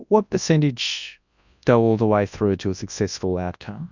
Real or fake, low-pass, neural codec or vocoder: fake; 7.2 kHz; codec, 24 kHz, 0.9 kbps, WavTokenizer, large speech release